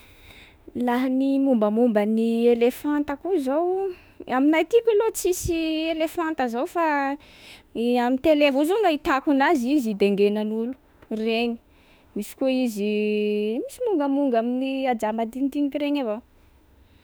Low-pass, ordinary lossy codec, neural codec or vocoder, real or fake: none; none; autoencoder, 48 kHz, 32 numbers a frame, DAC-VAE, trained on Japanese speech; fake